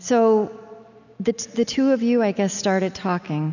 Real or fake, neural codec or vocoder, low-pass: real; none; 7.2 kHz